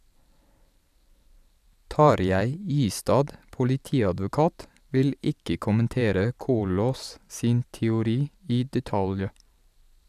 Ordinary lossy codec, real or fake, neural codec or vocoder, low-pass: none; fake; vocoder, 44.1 kHz, 128 mel bands every 512 samples, BigVGAN v2; 14.4 kHz